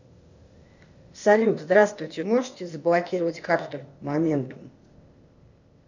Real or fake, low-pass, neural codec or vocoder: fake; 7.2 kHz; codec, 16 kHz, 0.8 kbps, ZipCodec